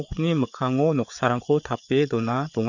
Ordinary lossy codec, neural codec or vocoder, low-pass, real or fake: none; codec, 16 kHz, 4 kbps, FreqCodec, larger model; 7.2 kHz; fake